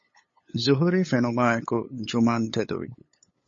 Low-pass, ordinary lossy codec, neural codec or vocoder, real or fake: 7.2 kHz; MP3, 32 kbps; codec, 16 kHz, 8 kbps, FunCodec, trained on LibriTTS, 25 frames a second; fake